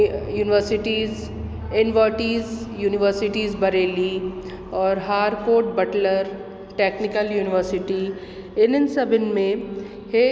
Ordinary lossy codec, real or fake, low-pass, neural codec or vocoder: none; real; none; none